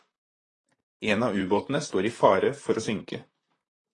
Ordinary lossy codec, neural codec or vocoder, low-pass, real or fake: AAC, 32 kbps; vocoder, 44.1 kHz, 128 mel bands, Pupu-Vocoder; 10.8 kHz; fake